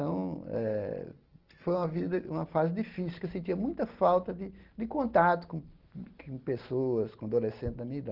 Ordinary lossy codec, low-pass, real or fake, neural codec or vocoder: Opus, 16 kbps; 5.4 kHz; real; none